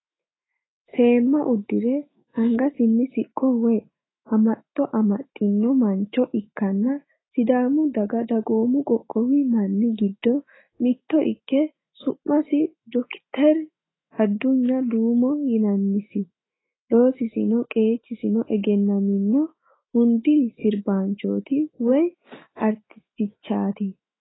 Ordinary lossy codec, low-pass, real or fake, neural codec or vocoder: AAC, 16 kbps; 7.2 kHz; fake; autoencoder, 48 kHz, 128 numbers a frame, DAC-VAE, trained on Japanese speech